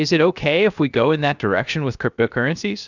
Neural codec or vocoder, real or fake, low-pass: codec, 16 kHz, 0.7 kbps, FocalCodec; fake; 7.2 kHz